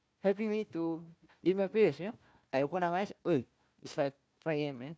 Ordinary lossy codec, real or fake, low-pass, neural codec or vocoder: none; fake; none; codec, 16 kHz, 1 kbps, FunCodec, trained on Chinese and English, 50 frames a second